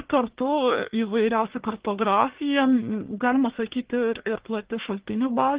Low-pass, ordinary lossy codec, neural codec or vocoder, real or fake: 3.6 kHz; Opus, 16 kbps; codec, 44.1 kHz, 1.7 kbps, Pupu-Codec; fake